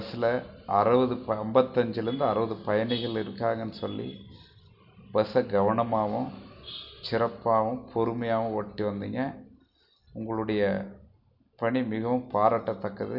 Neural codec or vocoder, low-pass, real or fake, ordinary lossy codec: none; 5.4 kHz; real; none